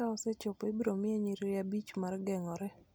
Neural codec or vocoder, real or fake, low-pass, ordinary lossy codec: none; real; none; none